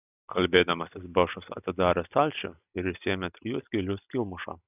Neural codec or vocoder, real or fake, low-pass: none; real; 3.6 kHz